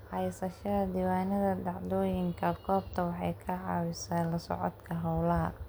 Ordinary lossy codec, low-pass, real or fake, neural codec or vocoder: none; none; real; none